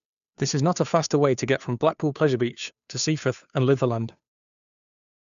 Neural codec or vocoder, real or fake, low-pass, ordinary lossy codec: codec, 16 kHz, 2 kbps, FunCodec, trained on Chinese and English, 25 frames a second; fake; 7.2 kHz; none